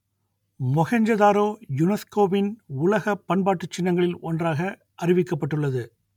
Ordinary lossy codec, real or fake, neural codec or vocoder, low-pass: MP3, 96 kbps; real; none; 19.8 kHz